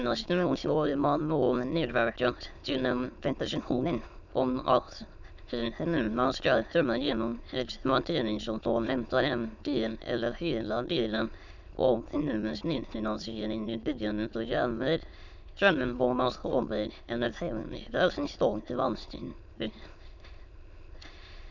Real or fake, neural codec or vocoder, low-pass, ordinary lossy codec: fake; autoencoder, 22.05 kHz, a latent of 192 numbers a frame, VITS, trained on many speakers; 7.2 kHz; none